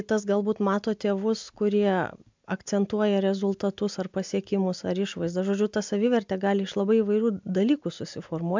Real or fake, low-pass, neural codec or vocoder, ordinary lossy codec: real; 7.2 kHz; none; MP3, 64 kbps